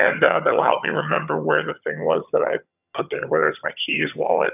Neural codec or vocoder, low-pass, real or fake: vocoder, 22.05 kHz, 80 mel bands, HiFi-GAN; 3.6 kHz; fake